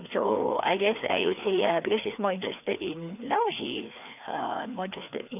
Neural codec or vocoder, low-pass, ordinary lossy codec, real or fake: codec, 16 kHz, 2 kbps, FreqCodec, larger model; 3.6 kHz; none; fake